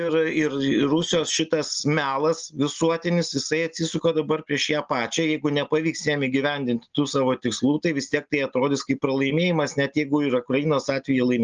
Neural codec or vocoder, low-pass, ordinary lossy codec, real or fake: none; 7.2 kHz; Opus, 24 kbps; real